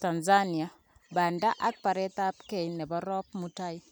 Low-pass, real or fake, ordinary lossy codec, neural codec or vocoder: none; real; none; none